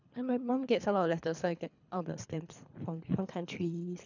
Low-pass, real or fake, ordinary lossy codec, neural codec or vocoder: 7.2 kHz; fake; none; codec, 24 kHz, 3 kbps, HILCodec